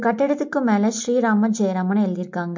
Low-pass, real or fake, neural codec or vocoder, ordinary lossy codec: 7.2 kHz; real; none; MP3, 48 kbps